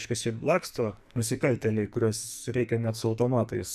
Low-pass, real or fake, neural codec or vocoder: 14.4 kHz; fake; codec, 44.1 kHz, 2.6 kbps, SNAC